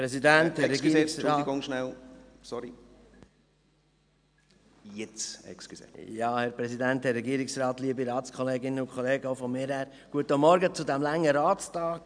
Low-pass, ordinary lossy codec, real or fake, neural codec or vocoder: 9.9 kHz; none; real; none